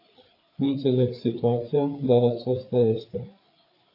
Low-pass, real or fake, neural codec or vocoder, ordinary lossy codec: 5.4 kHz; fake; codec, 16 kHz, 4 kbps, FreqCodec, larger model; AAC, 32 kbps